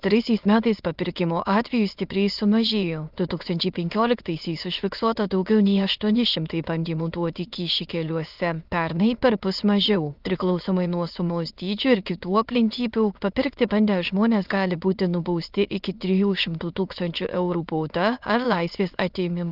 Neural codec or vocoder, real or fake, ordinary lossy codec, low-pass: autoencoder, 22.05 kHz, a latent of 192 numbers a frame, VITS, trained on many speakers; fake; Opus, 32 kbps; 5.4 kHz